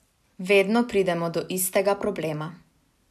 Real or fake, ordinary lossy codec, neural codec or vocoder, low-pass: real; none; none; 14.4 kHz